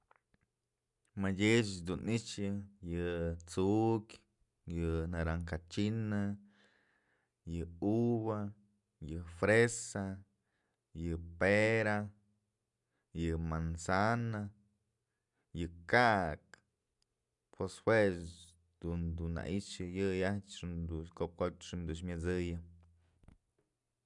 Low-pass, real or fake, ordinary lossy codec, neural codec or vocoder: 10.8 kHz; real; MP3, 96 kbps; none